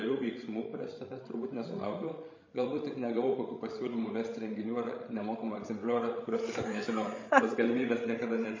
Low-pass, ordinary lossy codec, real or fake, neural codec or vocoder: 7.2 kHz; MP3, 32 kbps; fake; codec, 16 kHz, 16 kbps, FreqCodec, larger model